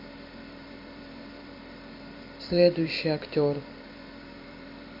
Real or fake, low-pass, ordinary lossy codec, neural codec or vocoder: fake; 5.4 kHz; MP3, 32 kbps; vocoder, 44.1 kHz, 128 mel bands every 256 samples, BigVGAN v2